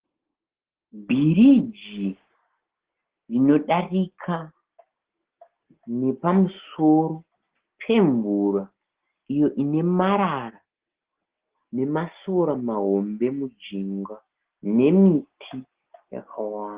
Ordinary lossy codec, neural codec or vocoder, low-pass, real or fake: Opus, 16 kbps; none; 3.6 kHz; real